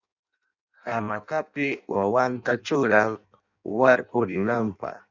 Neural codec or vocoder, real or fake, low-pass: codec, 16 kHz in and 24 kHz out, 0.6 kbps, FireRedTTS-2 codec; fake; 7.2 kHz